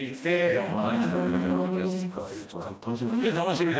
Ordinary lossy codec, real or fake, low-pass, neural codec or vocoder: none; fake; none; codec, 16 kHz, 1 kbps, FreqCodec, smaller model